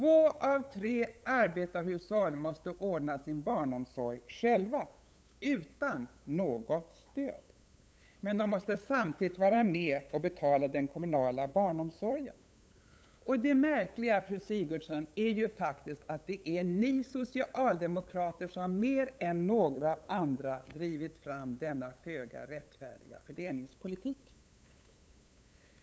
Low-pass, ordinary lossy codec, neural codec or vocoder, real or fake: none; none; codec, 16 kHz, 8 kbps, FunCodec, trained on LibriTTS, 25 frames a second; fake